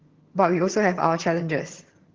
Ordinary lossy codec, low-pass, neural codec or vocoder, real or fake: Opus, 16 kbps; 7.2 kHz; vocoder, 22.05 kHz, 80 mel bands, HiFi-GAN; fake